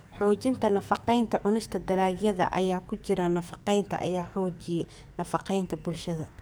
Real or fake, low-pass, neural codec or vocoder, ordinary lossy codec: fake; none; codec, 44.1 kHz, 2.6 kbps, SNAC; none